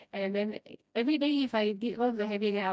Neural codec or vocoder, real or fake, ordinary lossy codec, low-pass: codec, 16 kHz, 1 kbps, FreqCodec, smaller model; fake; none; none